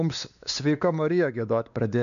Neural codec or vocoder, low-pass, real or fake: codec, 16 kHz, 2 kbps, X-Codec, WavLM features, trained on Multilingual LibriSpeech; 7.2 kHz; fake